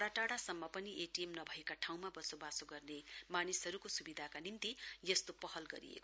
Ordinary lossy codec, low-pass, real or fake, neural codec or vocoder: none; none; real; none